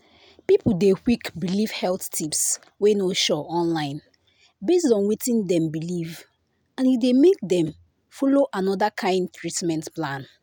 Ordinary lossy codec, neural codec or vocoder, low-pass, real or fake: none; none; none; real